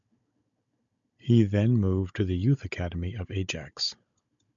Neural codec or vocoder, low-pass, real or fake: codec, 16 kHz, 16 kbps, FunCodec, trained on Chinese and English, 50 frames a second; 7.2 kHz; fake